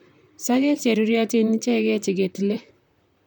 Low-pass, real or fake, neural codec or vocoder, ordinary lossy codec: 19.8 kHz; fake; vocoder, 44.1 kHz, 128 mel bands, Pupu-Vocoder; none